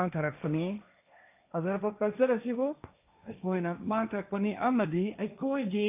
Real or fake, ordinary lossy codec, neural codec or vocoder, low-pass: fake; AAC, 32 kbps; codec, 16 kHz, 1.1 kbps, Voila-Tokenizer; 3.6 kHz